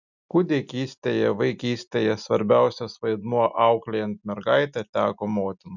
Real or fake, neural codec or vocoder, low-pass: real; none; 7.2 kHz